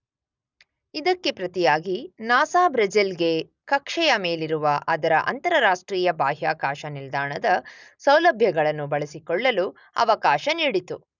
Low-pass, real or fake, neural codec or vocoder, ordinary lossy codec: 7.2 kHz; real; none; none